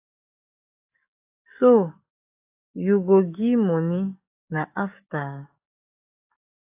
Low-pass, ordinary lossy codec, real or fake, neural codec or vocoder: 3.6 kHz; AAC, 32 kbps; fake; codec, 44.1 kHz, 7.8 kbps, DAC